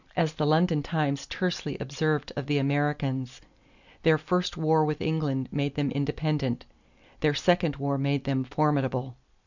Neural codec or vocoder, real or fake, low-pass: none; real; 7.2 kHz